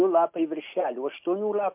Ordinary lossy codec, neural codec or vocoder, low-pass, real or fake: MP3, 32 kbps; none; 3.6 kHz; real